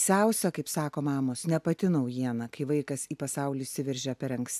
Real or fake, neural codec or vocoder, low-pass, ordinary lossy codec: real; none; 14.4 kHz; AAC, 96 kbps